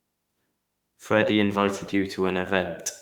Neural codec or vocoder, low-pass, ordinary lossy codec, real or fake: autoencoder, 48 kHz, 32 numbers a frame, DAC-VAE, trained on Japanese speech; 19.8 kHz; Opus, 64 kbps; fake